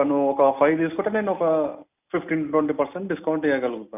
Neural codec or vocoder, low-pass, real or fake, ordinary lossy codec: none; 3.6 kHz; real; none